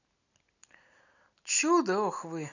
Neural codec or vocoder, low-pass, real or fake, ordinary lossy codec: none; 7.2 kHz; real; none